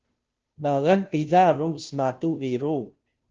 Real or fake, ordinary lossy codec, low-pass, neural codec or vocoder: fake; Opus, 16 kbps; 7.2 kHz; codec, 16 kHz, 0.5 kbps, FunCodec, trained on Chinese and English, 25 frames a second